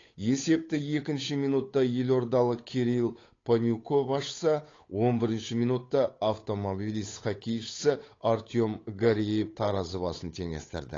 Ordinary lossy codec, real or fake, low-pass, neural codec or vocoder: AAC, 32 kbps; fake; 7.2 kHz; codec, 16 kHz, 8 kbps, FunCodec, trained on Chinese and English, 25 frames a second